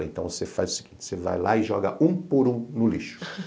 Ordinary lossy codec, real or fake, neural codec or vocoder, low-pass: none; real; none; none